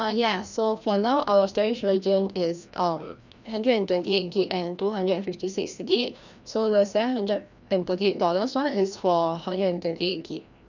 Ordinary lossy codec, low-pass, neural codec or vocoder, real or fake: none; 7.2 kHz; codec, 16 kHz, 1 kbps, FreqCodec, larger model; fake